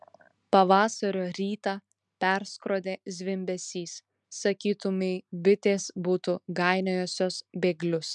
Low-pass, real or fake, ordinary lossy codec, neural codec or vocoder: 10.8 kHz; real; MP3, 96 kbps; none